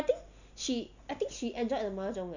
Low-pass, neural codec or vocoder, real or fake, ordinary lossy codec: 7.2 kHz; none; real; none